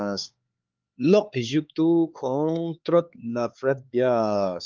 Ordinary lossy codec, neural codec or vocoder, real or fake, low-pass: Opus, 32 kbps; codec, 16 kHz, 4 kbps, X-Codec, HuBERT features, trained on LibriSpeech; fake; 7.2 kHz